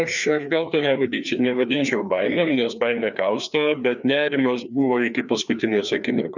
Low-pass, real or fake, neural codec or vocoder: 7.2 kHz; fake; codec, 16 kHz, 2 kbps, FreqCodec, larger model